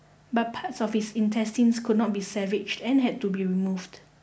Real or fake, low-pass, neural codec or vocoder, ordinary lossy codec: real; none; none; none